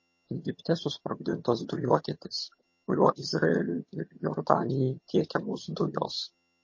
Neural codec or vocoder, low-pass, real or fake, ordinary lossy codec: vocoder, 22.05 kHz, 80 mel bands, HiFi-GAN; 7.2 kHz; fake; MP3, 32 kbps